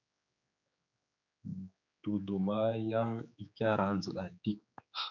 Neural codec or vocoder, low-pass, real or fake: codec, 16 kHz, 4 kbps, X-Codec, HuBERT features, trained on general audio; 7.2 kHz; fake